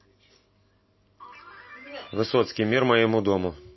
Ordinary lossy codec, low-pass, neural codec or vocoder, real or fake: MP3, 24 kbps; 7.2 kHz; none; real